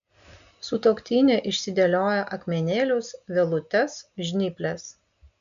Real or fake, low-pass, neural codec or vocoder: real; 7.2 kHz; none